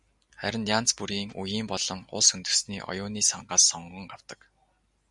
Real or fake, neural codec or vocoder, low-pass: real; none; 10.8 kHz